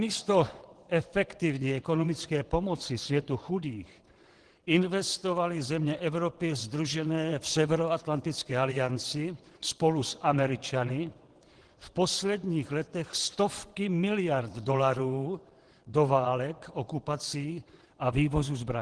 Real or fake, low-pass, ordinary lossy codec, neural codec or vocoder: fake; 9.9 kHz; Opus, 16 kbps; vocoder, 22.05 kHz, 80 mel bands, WaveNeXt